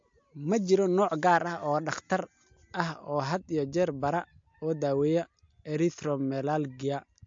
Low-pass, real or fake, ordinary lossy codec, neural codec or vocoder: 7.2 kHz; real; MP3, 48 kbps; none